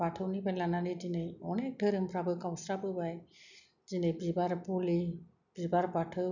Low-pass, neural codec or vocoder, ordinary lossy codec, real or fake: 7.2 kHz; none; none; real